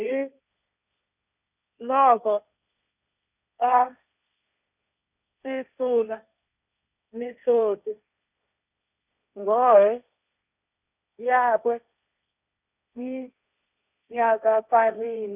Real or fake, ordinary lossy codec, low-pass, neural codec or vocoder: fake; none; 3.6 kHz; codec, 16 kHz, 1.1 kbps, Voila-Tokenizer